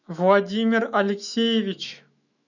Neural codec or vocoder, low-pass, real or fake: autoencoder, 48 kHz, 128 numbers a frame, DAC-VAE, trained on Japanese speech; 7.2 kHz; fake